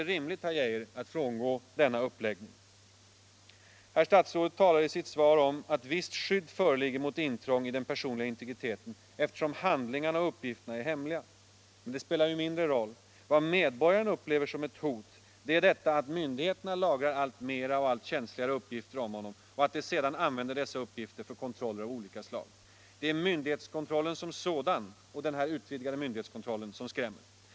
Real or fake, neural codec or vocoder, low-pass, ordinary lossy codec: real; none; none; none